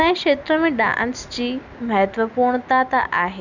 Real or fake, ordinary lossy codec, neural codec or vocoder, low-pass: real; none; none; 7.2 kHz